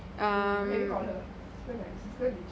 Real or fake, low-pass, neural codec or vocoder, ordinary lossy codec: real; none; none; none